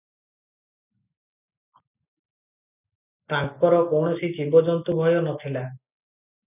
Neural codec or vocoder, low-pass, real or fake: none; 3.6 kHz; real